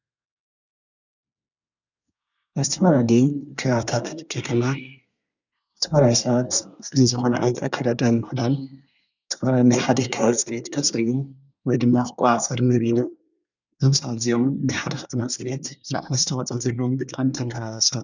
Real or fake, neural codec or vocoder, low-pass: fake; codec, 24 kHz, 1 kbps, SNAC; 7.2 kHz